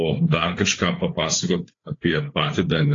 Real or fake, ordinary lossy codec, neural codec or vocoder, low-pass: fake; AAC, 32 kbps; codec, 16 kHz, 4 kbps, FreqCodec, larger model; 7.2 kHz